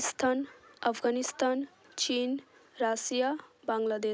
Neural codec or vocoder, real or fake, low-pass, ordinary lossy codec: none; real; none; none